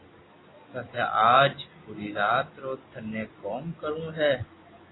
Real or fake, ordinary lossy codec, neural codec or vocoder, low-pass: real; AAC, 16 kbps; none; 7.2 kHz